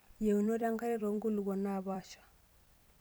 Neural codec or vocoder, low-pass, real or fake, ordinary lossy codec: vocoder, 44.1 kHz, 128 mel bands every 256 samples, BigVGAN v2; none; fake; none